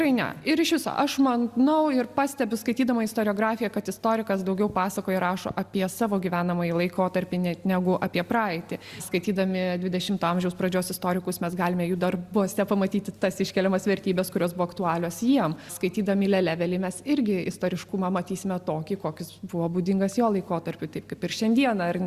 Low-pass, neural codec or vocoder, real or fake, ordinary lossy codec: 14.4 kHz; none; real; Opus, 64 kbps